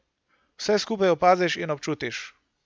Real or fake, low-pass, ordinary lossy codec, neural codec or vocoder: real; none; none; none